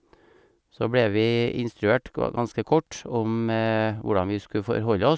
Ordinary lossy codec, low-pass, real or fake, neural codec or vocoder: none; none; real; none